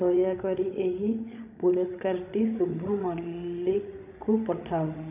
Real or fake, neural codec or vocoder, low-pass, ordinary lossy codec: fake; codec, 16 kHz, 16 kbps, FreqCodec, larger model; 3.6 kHz; none